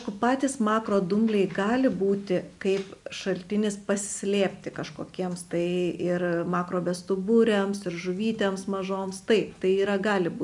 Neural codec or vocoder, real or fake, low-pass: none; real; 10.8 kHz